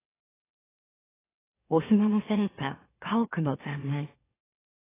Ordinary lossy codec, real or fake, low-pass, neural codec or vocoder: AAC, 16 kbps; fake; 3.6 kHz; autoencoder, 44.1 kHz, a latent of 192 numbers a frame, MeloTTS